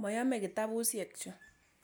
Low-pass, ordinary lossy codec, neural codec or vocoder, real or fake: none; none; none; real